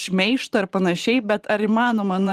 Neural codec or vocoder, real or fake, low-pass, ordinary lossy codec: vocoder, 44.1 kHz, 128 mel bands every 512 samples, BigVGAN v2; fake; 14.4 kHz; Opus, 24 kbps